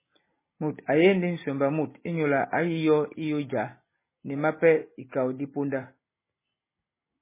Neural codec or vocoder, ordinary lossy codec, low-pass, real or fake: none; MP3, 16 kbps; 3.6 kHz; real